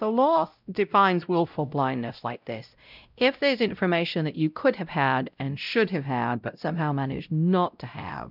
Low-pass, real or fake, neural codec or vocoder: 5.4 kHz; fake; codec, 16 kHz, 0.5 kbps, X-Codec, WavLM features, trained on Multilingual LibriSpeech